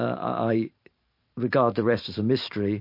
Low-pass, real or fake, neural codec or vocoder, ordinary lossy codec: 5.4 kHz; fake; vocoder, 44.1 kHz, 128 mel bands every 512 samples, BigVGAN v2; MP3, 32 kbps